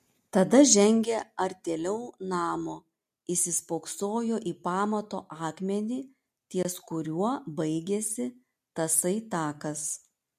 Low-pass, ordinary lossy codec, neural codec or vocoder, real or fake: 14.4 kHz; MP3, 64 kbps; none; real